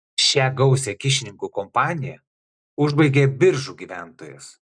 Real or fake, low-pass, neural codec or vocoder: real; 9.9 kHz; none